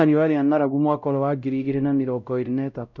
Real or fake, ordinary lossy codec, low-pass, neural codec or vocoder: fake; none; 7.2 kHz; codec, 16 kHz, 0.5 kbps, X-Codec, WavLM features, trained on Multilingual LibriSpeech